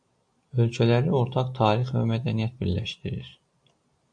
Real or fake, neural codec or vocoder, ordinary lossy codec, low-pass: real; none; AAC, 64 kbps; 9.9 kHz